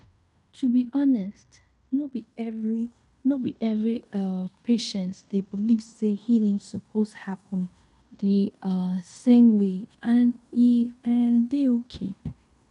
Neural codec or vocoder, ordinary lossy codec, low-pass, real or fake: codec, 16 kHz in and 24 kHz out, 0.9 kbps, LongCat-Audio-Codec, fine tuned four codebook decoder; none; 10.8 kHz; fake